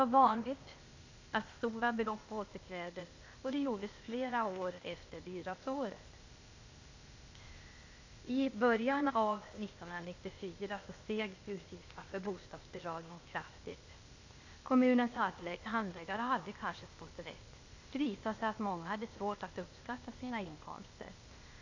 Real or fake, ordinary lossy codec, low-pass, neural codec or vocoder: fake; AAC, 48 kbps; 7.2 kHz; codec, 16 kHz, 0.8 kbps, ZipCodec